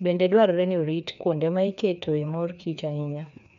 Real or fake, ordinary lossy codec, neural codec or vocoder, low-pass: fake; none; codec, 16 kHz, 2 kbps, FreqCodec, larger model; 7.2 kHz